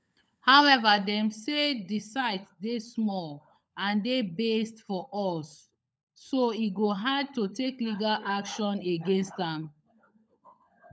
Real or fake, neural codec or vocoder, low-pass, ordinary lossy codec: fake; codec, 16 kHz, 16 kbps, FunCodec, trained on LibriTTS, 50 frames a second; none; none